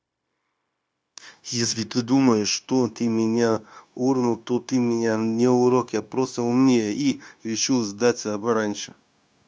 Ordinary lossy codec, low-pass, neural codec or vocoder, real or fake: none; none; codec, 16 kHz, 0.9 kbps, LongCat-Audio-Codec; fake